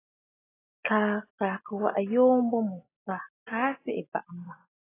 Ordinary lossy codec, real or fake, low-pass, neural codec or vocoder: AAC, 16 kbps; real; 3.6 kHz; none